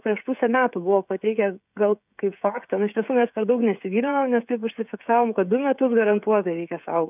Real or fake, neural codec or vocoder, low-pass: fake; codec, 16 kHz, 16 kbps, FreqCodec, smaller model; 3.6 kHz